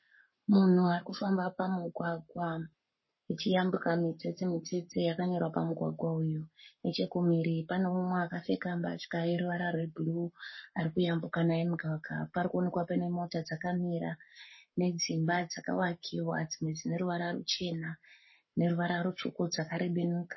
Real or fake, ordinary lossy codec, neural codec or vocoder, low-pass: fake; MP3, 24 kbps; codec, 44.1 kHz, 7.8 kbps, Pupu-Codec; 7.2 kHz